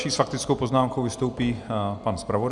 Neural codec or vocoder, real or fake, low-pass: none; real; 10.8 kHz